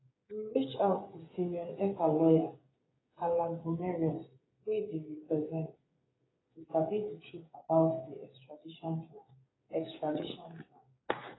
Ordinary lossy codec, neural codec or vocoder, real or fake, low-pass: AAC, 16 kbps; codec, 16 kHz, 8 kbps, FreqCodec, smaller model; fake; 7.2 kHz